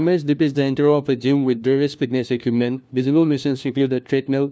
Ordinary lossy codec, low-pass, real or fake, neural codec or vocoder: none; none; fake; codec, 16 kHz, 1 kbps, FunCodec, trained on LibriTTS, 50 frames a second